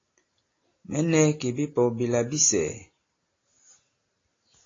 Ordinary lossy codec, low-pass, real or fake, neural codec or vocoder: AAC, 32 kbps; 7.2 kHz; real; none